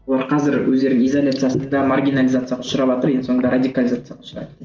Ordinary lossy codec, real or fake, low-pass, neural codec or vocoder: Opus, 32 kbps; real; 7.2 kHz; none